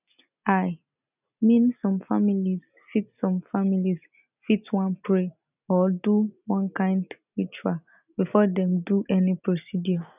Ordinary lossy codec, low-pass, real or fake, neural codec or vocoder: none; 3.6 kHz; real; none